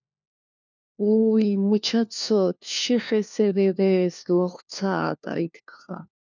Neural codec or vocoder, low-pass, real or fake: codec, 16 kHz, 1 kbps, FunCodec, trained on LibriTTS, 50 frames a second; 7.2 kHz; fake